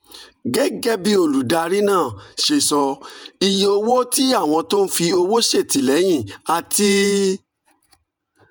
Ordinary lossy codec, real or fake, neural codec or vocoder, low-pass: none; fake; vocoder, 48 kHz, 128 mel bands, Vocos; none